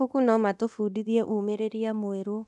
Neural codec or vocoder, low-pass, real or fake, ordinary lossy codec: codec, 24 kHz, 0.9 kbps, DualCodec; none; fake; none